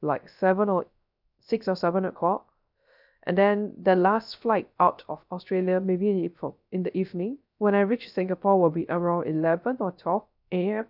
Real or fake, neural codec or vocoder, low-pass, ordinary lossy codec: fake; codec, 16 kHz, 0.3 kbps, FocalCodec; 5.4 kHz; none